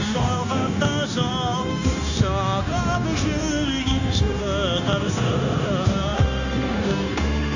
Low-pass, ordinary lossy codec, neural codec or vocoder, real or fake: 7.2 kHz; none; codec, 16 kHz, 0.9 kbps, LongCat-Audio-Codec; fake